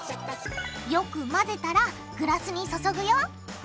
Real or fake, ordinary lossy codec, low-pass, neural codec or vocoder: real; none; none; none